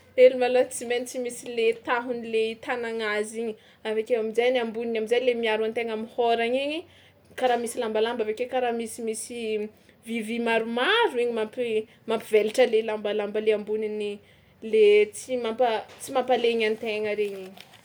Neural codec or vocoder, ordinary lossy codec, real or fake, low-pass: none; none; real; none